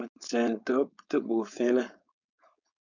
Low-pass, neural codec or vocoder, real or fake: 7.2 kHz; codec, 16 kHz, 4.8 kbps, FACodec; fake